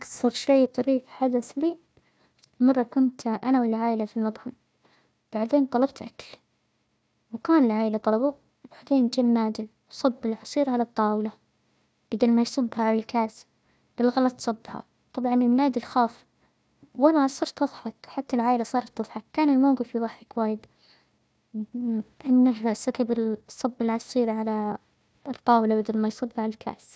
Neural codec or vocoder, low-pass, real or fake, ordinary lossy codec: codec, 16 kHz, 1 kbps, FunCodec, trained on Chinese and English, 50 frames a second; none; fake; none